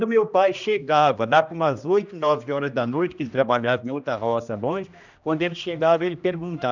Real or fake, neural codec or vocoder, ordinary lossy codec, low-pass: fake; codec, 16 kHz, 1 kbps, X-Codec, HuBERT features, trained on general audio; none; 7.2 kHz